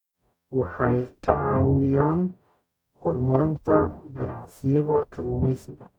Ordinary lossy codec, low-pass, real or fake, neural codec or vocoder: none; 19.8 kHz; fake; codec, 44.1 kHz, 0.9 kbps, DAC